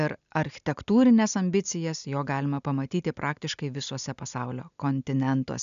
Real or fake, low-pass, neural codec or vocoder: real; 7.2 kHz; none